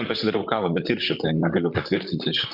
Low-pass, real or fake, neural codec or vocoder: 5.4 kHz; fake; vocoder, 44.1 kHz, 128 mel bands, Pupu-Vocoder